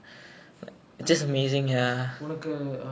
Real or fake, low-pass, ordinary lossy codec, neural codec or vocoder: real; none; none; none